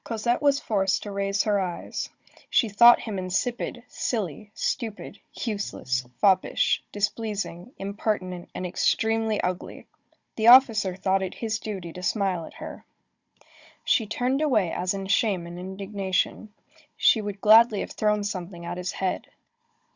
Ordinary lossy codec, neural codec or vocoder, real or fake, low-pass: Opus, 64 kbps; codec, 16 kHz, 16 kbps, FunCodec, trained on Chinese and English, 50 frames a second; fake; 7.2 kHz